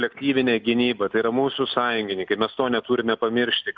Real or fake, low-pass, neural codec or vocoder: real; 7.2 kHz; none